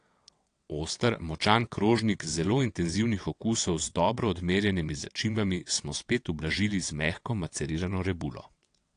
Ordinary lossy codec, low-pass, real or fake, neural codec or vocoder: AAC, 48 kbps; 9.9 kHz; fake; vocoder, 22.05 kHz, 80 mel bands, WaveNeXt